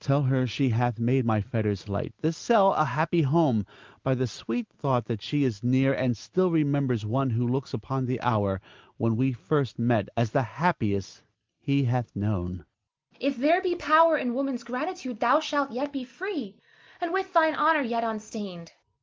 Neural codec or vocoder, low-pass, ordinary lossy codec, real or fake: none; 7.2 kHz; Opus, 32 kbps; real